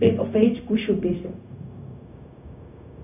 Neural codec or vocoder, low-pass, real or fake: codec, 16 kHz, 0.4 kbps, LongCat-Audio-Codec; 3.6 kHz; fake